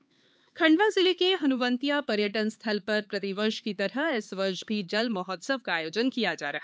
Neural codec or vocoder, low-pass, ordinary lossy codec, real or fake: codec, 16 kHz, 4 kbps, X-Codec, HuBERT features, trained on LibriSpeech; none; none; fake